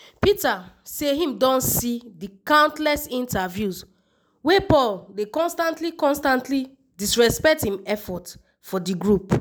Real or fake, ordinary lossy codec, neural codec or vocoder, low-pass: real; none; none; none